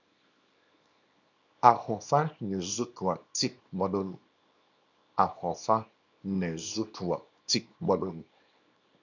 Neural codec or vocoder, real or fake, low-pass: codec, 24 kHz, 0.9 kbps, WavTokenizer, small release; fake; 7.2 kHz